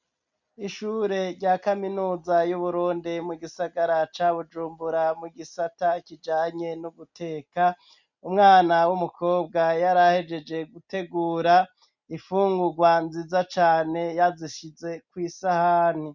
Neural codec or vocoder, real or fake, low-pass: none; real; 7.2 kHz